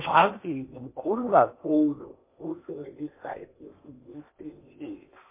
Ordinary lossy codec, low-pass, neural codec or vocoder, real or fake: MP3, 24 kbps; 3.6 kHz; codec, 16 kHz in and 24 kHz out, 0.8 kbps, FocalCodec, streaming, 65536 codes; fake